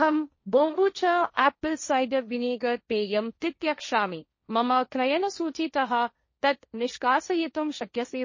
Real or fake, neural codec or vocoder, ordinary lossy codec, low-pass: fake; codec, 16 kHz, 1.1 kbps, Voila-Tokenizer; MP3, 32 kbps; 7.2 kHz